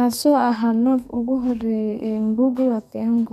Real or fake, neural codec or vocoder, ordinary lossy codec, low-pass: fake; codec, 32 kHz, 1.9 kbps, SNAC; none; 14.4 kHz